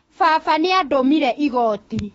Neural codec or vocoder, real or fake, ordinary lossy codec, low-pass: autoencoder, 48 kHz, 32 numbers a frame, DAC-VAE, trained on Japanese speech; fake; AAC, 24 kbps; 19.8 kHz